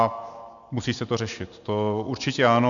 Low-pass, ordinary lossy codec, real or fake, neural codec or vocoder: 7.2 kHz; MP3, 96 kbps; real; none